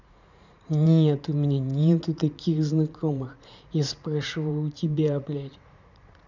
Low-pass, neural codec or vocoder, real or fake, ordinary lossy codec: 7.2 kHz; vocoder, 44.1 kHz, 80 mel bands, Vocos; fake; none